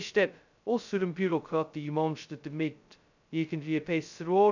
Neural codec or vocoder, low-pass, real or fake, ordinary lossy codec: codec, 16 kHz, 0.2 kbps, FocalCodec; 7.2 kHz; fake; none